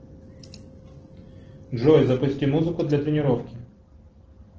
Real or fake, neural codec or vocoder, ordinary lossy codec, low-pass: real; none; Opus, 16 kbps; 7.2 kHz